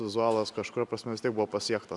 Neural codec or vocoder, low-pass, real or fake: none; 10.8 kHz; real